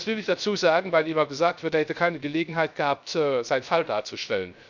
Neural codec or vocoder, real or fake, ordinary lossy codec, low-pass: codec, 16 kHz, 0.3 kbps, FocalCodec; fake; none; 7.2 kHz